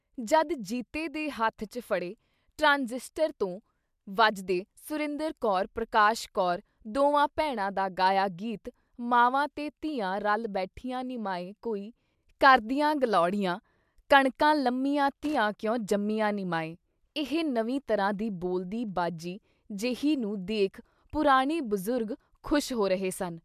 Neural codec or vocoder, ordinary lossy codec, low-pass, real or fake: none; MP3, 96 kbps; 14.4 kHz; real